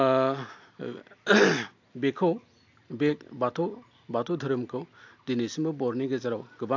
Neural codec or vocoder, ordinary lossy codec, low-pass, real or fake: none; AAC, 48 kbps; 7.2 kHz; real